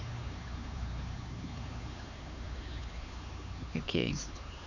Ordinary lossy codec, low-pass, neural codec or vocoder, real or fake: none; 7.2 kHz; codec, 16 kHz, 4 kbps, X-Codec, HuBERT features, trained on LibriSpeech; fake